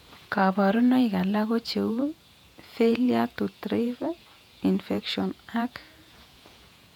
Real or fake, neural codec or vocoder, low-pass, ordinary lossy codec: fake; vocoder, 48 kHz, 128 mel bands, Vocos; 19.8 kHz; MP3, 96 kbps